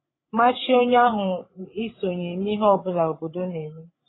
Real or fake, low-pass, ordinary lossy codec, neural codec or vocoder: fake; 7.2 kHz; AAC, 16 kbps; codec, 16 kHz, 16 kbps, FreqCodec, larger model